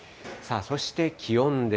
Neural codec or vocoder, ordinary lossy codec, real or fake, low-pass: none; none; real; none